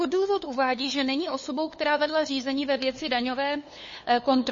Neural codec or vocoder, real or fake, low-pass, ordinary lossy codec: codec, 16 kHz, 2 kbps, FunCodec, trained on LibriTTS, 25 frames a second; fake; 7.2 kHz; MP3, 32 kbps